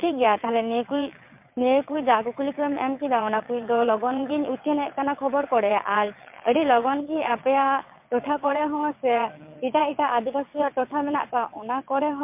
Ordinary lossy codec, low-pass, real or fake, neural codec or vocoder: MP3, 32 kbps; 3.6 kHz; fake; vocoder, 22.05 kHz, 80 mel bands, WaveNeXt